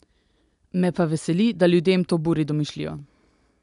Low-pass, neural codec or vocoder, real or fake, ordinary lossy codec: 10.8 kHz; none; real; none